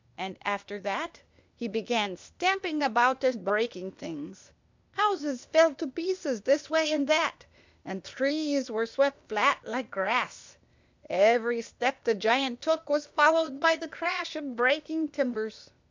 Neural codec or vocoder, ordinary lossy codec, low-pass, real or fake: codec, 16 kHz, 0.8 kbps, ZipCodec; MP3, 64 kbps; 7.2 kHz; fake